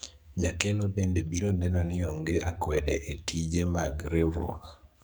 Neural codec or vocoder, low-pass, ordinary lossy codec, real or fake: codec, 44.1 kHz, 2.6 kbps, SNAC; none; none; fake